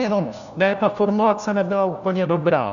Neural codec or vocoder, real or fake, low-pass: codec, 16 kHz, 1 kbps, FunCodec, trained on LibriTTS, 50 frames a second; fake; 7.2 kHz